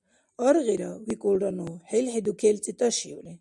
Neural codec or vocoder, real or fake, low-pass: none; real; 10.8 kHz